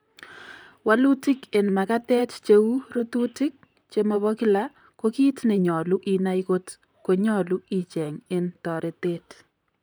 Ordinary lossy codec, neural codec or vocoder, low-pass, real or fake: none; vocoder, 44.1 kHz, 128 mel bands, Pupu-Vocoder; none; fake